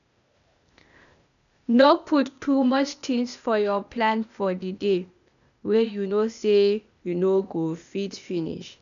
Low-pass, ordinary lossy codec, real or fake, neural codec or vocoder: 7.2 kHz; none; fake; codec, 16 kHz, 0.8 kbps, ZipCodec